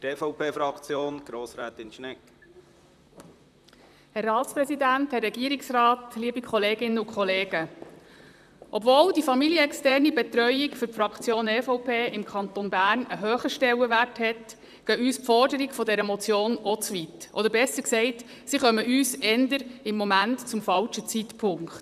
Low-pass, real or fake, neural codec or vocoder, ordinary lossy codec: 14.4 kHz; fake; vocoder, 44.1 kHz, 128 mel bands, Pupu-Vocoder; none